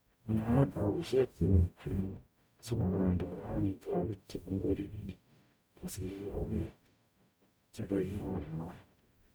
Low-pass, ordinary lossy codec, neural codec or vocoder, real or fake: none; none; codec, 44.1 kHz, 0.9 kbps, DAC; fake